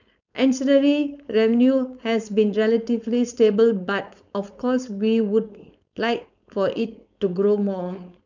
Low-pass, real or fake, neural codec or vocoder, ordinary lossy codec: 7.2 kHz; fake; codec, 16 kHz, 4.8 kbps, FACodec; none